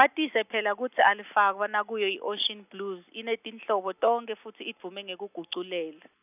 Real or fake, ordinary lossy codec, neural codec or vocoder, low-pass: real; none; none; 3.6 kHz